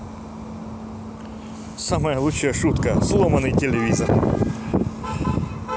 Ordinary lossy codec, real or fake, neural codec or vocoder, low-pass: none; real; none; none